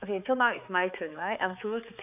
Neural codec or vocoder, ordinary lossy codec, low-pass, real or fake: codec, 16 kHz, 4 kbps, X-Codec, HuBERT features, trained on balanced general audio; none; 3.6 kHz; fake